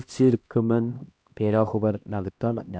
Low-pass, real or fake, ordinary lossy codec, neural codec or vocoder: none; fake; none; codec, 16 kHz, 1 kbps, X-Codec, HuBERT features, trained on LibriSpeech